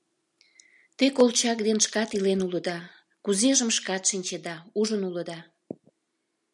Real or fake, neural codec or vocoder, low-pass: real; none; 10.8 kHz